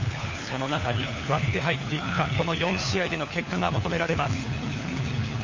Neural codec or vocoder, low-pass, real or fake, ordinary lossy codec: codec, 16 kHz, 4 kbps, FunCodec, trained on LibriTTS, 50 frames a second; 7.2 kHz; fake; MP3, 32 kbps